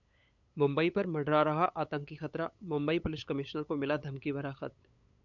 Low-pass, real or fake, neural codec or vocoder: 7.2 kHz; fake; codec, 16 kHz, 8 kbps, FunCodec, trained on LibriTTS, 25 frames a second